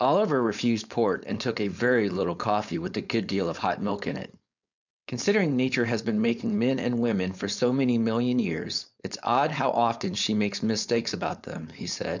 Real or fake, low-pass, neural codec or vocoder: fake; 7.2 kHz; codec, 16 kHz, 4.8 kbps, FACodec